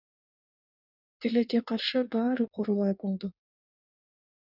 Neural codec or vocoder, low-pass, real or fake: codec, 16 kHz in and 24 kHz out, 1.1 kbps, FireRedTTS-2 codec; 5.4 kHz; fake